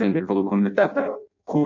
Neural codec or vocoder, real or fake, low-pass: codec, 16 kHz in and 24 kHz out, 0.6 kbps, FireRedTTS-2 codec; fake; 7.2 kHz